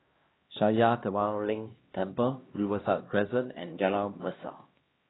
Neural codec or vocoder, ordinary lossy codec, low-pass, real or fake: codec, 16 kHz, 1 kbps, X-Codec, HuBERT features, trained on LibriSpeech; AAC, 16 kbps; 7.2 kHz; fake